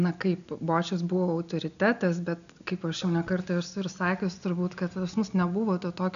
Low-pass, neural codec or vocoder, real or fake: 7.2 kHz; none; real